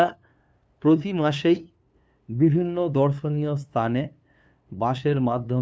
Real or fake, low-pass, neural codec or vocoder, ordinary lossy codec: fake; none; codec, 16 kHz, 2 kbps, FunCodec, trained on LibriTTS, 25 frames a second; none